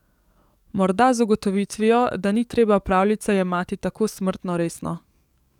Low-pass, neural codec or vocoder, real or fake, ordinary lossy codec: 19.8 kHz; codec, 44.1 kHz, 7.8 kbps, DAC; fake; none